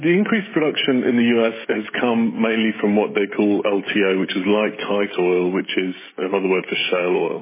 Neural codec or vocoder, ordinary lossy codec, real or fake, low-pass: codec, 16 kHz, 16 kbps, FreqCodec, smaller model; MP3, 16 kbps; fake; 3.6 kHz